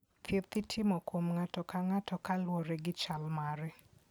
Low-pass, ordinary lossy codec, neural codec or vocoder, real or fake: none; none; none; real